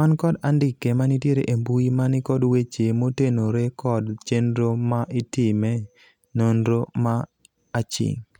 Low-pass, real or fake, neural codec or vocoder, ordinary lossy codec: 19.8 kHz; real; none; none